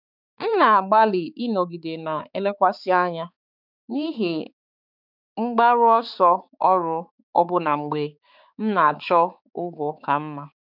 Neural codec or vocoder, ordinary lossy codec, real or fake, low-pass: codec, 16 kHz, 4 kbps, X-Codec, HuBERT features, trained on balanced general audio; none; fake; 5.4 kHz